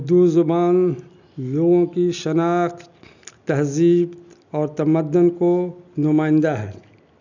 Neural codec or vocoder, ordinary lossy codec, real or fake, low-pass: none; none; real; 7.2 kHz